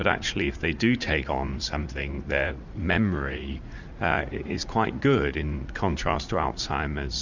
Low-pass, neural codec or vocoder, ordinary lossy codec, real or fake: 7.2 kHz; vocoder, 44.1 kHz, 80 mel bands, Vocos; Opus, 64 kbps; fake